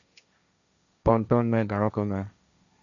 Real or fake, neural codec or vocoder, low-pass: fake; codec, 16 kHz, 1.1 kbps, Voila-Tokenizer; 7.2 kHz